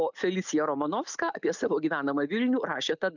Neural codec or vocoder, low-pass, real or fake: none; 7.2 kHz; real